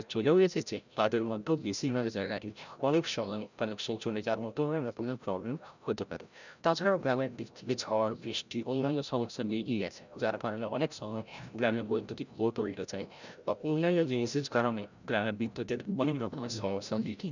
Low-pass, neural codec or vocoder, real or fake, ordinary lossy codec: 7.2 kHz; codec, 16 kHz, 0.5 kbps, FreqCodec, larger model; fake; none